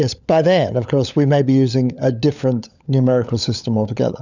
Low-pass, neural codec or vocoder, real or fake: 7.2 kHz; codec, 16 kHz, 16 kbps, FunCodec, trained on LibriTTS, 50 frames a second; fake